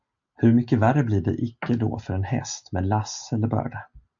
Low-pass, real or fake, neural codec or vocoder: 7.2 kHz; real; none